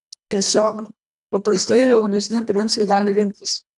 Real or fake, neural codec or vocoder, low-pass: fake; codec, 24 kHz, 1.5 kbps, HILCodec; 10.8 kHz